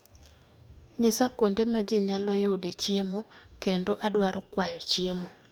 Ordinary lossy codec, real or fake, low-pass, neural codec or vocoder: none; fake; none; codec, 44.1 kHz, 2.6 kbps, DAC